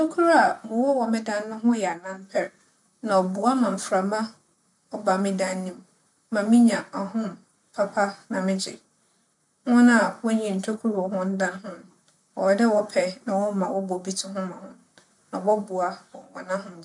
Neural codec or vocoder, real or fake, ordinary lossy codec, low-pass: vocoder, 44.1 kHz, 128 mel bands, Pupu-Vocoder; fake; none; 10.8 kHz